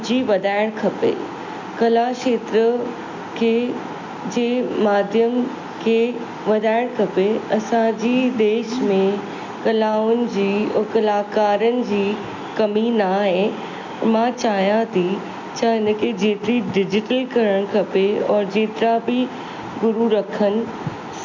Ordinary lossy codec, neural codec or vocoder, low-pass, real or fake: MP3, 64 kbps; none; 7.2 kHz; real